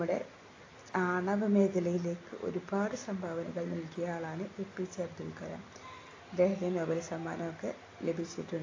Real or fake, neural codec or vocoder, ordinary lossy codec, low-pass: real; none; AAC, 32 kbps; 7.2 kHz